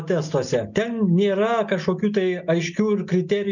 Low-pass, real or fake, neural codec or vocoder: 7.2 kHz; real; none